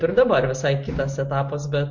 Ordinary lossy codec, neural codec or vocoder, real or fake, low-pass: MP3, 48 kbps; none; real; 7.2 kHz